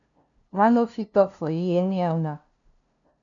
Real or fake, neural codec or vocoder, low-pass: fake; codec, 16 kHz, 0.5 kbps, FunCodec, trained on LibriTTS, 25 frames a second; 7.2 kHz